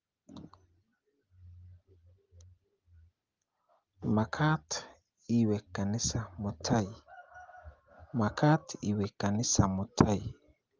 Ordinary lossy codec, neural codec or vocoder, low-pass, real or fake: Opus, 32 kbps; none; 7.2 kHz; real